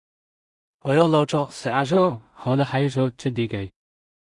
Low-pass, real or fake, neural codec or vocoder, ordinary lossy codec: 10.8 kHz; fake; codec, 16 kHz in and 24 kHz out, 0.4 kbps, LongCat-Audio-Codec, two codebook decoder; Opus, 64 kbps